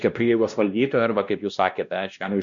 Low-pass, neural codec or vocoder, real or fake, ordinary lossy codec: 7.2 kHz; codec, 16 kHz, 1 kbps, X-Codec, WavLM features, trained on Multilingual LibriSpeech; fake; Opus, 64 kbps